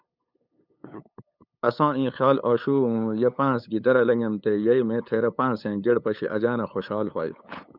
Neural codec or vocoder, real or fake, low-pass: codec, 16 kHz, 8 kbps, FunCodec, trained on LibriTTS, 25 frames a second; fake; 5.4 kHz